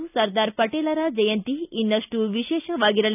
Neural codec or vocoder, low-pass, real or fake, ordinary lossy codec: none; 3.6 kHz; real; none